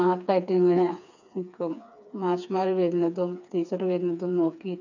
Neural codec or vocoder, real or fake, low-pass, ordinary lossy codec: codec, 16 kHz, 4 kbps, FreqCodec, smaller model; fake; 7.2 kHz; none